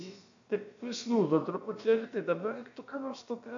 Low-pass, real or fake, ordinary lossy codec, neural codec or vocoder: 7.2 kHz; fake; AAC, 64 kbps; codec, 16 kHz, about 1 kbps, DyCAST, with the encoder's durations